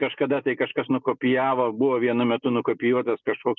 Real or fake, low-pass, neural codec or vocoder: real; 7.2 kHz; none